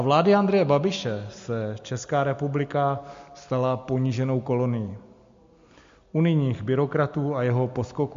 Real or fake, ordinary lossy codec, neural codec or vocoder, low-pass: real; MP3, 48 kbps; none; 7.2 kHz